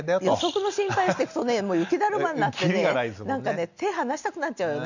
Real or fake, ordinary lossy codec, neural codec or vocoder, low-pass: real; none; none; 7.2 kHz